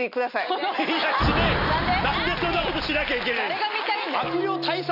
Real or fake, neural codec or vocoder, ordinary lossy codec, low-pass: real; none; none; 5.4 kHz